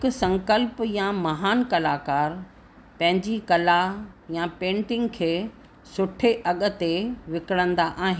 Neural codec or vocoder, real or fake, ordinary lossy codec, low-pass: none; real; none; none